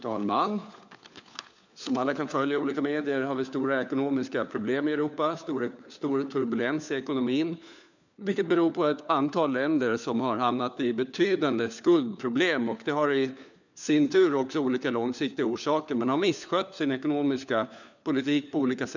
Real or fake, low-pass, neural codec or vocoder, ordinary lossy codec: fake; 7.2 kHz; codec, 16 kHz, 4 kbps, FunCodec, trained on LibriTTS, 50 frames a second; none